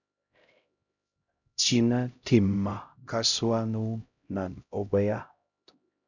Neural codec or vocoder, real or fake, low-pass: codec, 16 kHz, 0.5 kbps, X-Codec, HuBERT features, trained on LibriSpeech; fake; 7.2 kHz